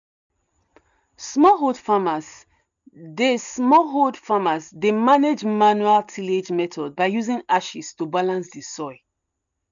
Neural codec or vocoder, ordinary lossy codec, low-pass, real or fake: none; none; 7.2 kHz; real